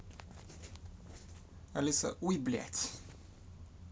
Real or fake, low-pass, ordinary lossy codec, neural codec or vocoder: real; none; none; none